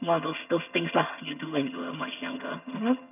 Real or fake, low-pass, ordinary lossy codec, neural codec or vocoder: fake; 3.6 kHz; none; vocoder, 22.05 kHz, 80 mel bands, HiFi-GAN